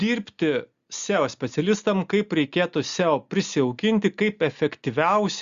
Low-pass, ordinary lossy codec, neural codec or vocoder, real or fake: 7.2 kHz; Opus, 64 kbps; none; real